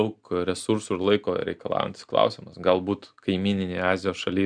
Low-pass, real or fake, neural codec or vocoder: 9.9 kHz; real; none